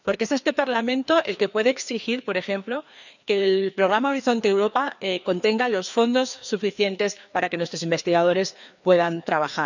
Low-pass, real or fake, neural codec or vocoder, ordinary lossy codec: 7.2 kHz; fake; codec, 16 kHz, 2 kbps, FreqCodec, larger model; none